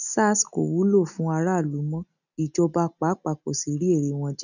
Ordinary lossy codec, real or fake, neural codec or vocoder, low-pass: none; real; none; 7.2 kHz